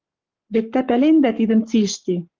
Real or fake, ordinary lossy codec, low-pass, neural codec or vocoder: fake; Opus, 24 kbps; 7.2 kHz; codec, 44.1 kHz, 7.8 kbps, Pupu-Codec